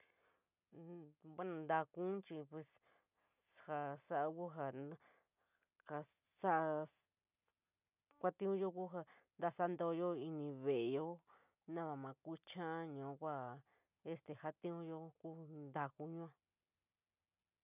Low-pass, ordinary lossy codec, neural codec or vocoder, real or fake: 3.6 kHz; none; none; real